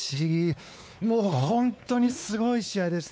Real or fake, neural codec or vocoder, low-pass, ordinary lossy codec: fake; codec, 16 kHz, 4 kbps, X-Codec, WavLM features, trained on Multilingual LibriSpeech; none; none